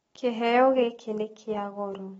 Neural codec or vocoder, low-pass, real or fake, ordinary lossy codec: none; 14.4 kHz; real; AAC, 24 kbps